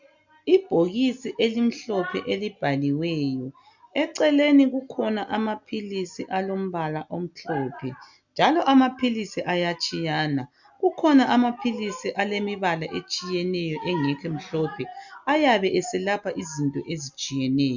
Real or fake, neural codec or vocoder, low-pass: real; none; 7.2 kHz